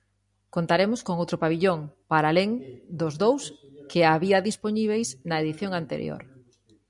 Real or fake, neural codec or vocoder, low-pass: real; none; 10.8 kHz